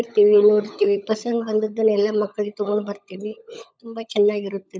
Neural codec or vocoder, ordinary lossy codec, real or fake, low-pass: codec, 16 kHz, 16 kbps, FunCodec, trained on LibriTTS, 50 frames a second; none; fake; none